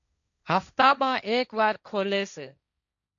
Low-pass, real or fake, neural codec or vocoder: 7.2 kHz; fake; codec, 16 kHz, 1.1 kbps, Voila-Tokenizer